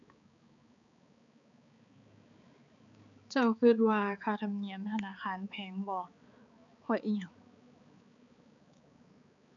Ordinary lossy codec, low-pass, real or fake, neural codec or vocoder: none; 7.2 kHz; fake; codec, 16 kHz, 4 kbps, X-Codec, HuBERT features, trained on balanced general audio